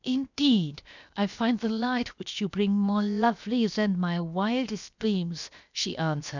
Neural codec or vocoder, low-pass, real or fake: codec, 16 kHz, 0.7 kbps, FocalCodec; 7.2 kHz; fake